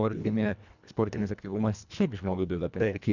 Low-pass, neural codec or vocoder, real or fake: 7.2 kHz; codec, 24 kHz, 1.5 kbps, HILCodec; fake